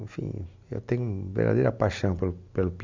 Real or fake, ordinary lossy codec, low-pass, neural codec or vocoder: real; MP3, 64 kbps; 7.2 kHz; none